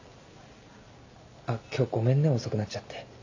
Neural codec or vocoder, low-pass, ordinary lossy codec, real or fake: none; 7.2 kHz; AAC, 48 kbps; real